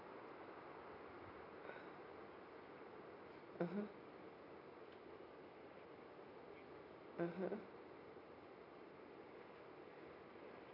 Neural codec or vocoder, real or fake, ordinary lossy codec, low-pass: none; real; none; 5.4 kHz